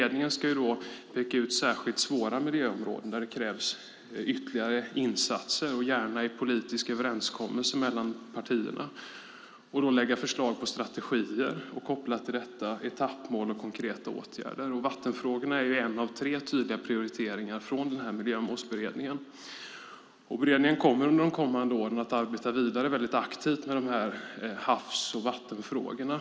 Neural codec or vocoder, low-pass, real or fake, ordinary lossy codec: none; none; real; none